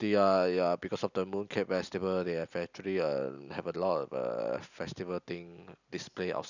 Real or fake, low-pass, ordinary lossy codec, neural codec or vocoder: real; 7.2 kHz; none; none